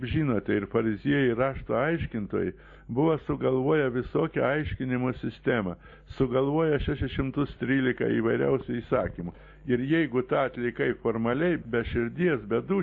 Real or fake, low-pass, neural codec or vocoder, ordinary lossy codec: real; 7.2 kHz; none; MP3, 32 kbps